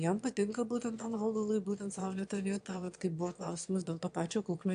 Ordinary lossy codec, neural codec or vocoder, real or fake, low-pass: AAC, 64 kbps; autoencoder, 22.05 kHz, a latent of 192 numbers a frame, VITS, trained on one speaker; fake; 9.9 kHz